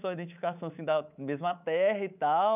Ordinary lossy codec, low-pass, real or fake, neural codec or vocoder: none; 3.6 kHz; fake; codec, 24 kHz, 3.1 kbps, DualCodec